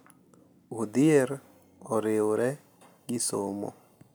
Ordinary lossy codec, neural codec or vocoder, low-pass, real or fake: none; none; none; real